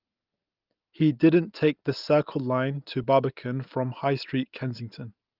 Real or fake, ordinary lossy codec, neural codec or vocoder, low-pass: real; Opus, 32 kbps; none; 5.4 kHz